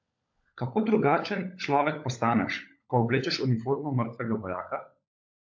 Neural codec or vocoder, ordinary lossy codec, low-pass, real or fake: codec, 16 kHz, 16 kbps, FunCodec, trained on LibriTTS, 50 frames a second; MP3, 48 kbps; 7.2 kHz; fake